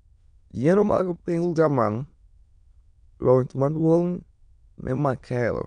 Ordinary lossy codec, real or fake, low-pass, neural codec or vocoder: none; fake; 9.9 kHz; autoencoder, 22.05 kHz, a latent of 192 numbers a frame, VITS, trained on many speakers